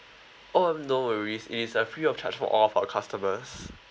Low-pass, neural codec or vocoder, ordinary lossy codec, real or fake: none; none; none; real